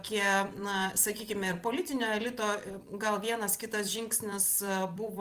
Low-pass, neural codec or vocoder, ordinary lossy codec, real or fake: 14.4 kHz; vocoder, 44.1 kHz, 128 mel bands every 256 samples, BigVGAN v2; Opus, 24 kbps; fake